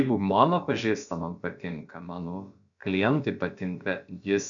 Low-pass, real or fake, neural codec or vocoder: 7.2 kHz; fake; codec, 16 kHz, about 1 kbps, DyCAST, with the encoder's durations